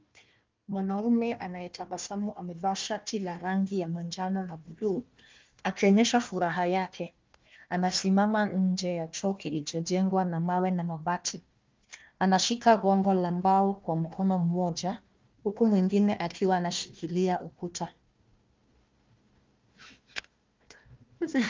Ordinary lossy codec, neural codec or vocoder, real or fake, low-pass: Opus, 32 kbps; codec, 16 kHz, 1 kbps, FunCodec, trained on Chinese and English, 50 frames a second; fake; 7.2 kHz